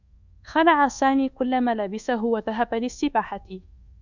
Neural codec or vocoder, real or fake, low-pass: codec, 24 kHz, 1.2 kbps, DualCodec; fake; 7.2 kHz